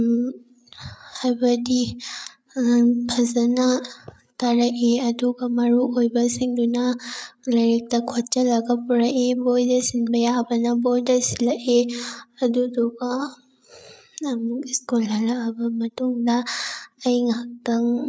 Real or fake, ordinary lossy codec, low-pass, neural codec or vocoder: fake; none; none; codec, 16 kHz, 8 kbps, FreqCodec, larger model